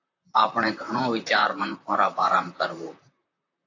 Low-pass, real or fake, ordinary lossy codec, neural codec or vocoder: 7.2 kHz; fake; AAC, 48 kbps; vocoder, 44.1 kHz, 128 mel bands, Pupu-Vocoder